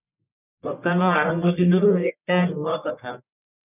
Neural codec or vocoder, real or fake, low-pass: codec, 44.1 kHz, 1.7 kbps, Pupu-Codec; fake; 3.6 kHz